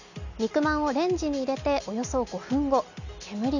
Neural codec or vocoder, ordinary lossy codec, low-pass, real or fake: none; none; 7.2 kHz; real